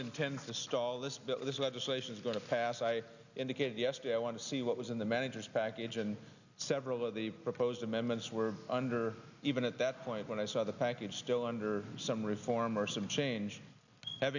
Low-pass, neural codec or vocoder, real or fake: 7.2 kHz; none; real